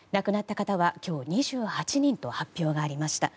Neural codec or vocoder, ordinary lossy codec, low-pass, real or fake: none; none; none; real